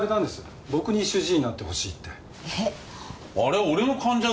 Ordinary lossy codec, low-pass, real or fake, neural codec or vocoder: none; none; real; none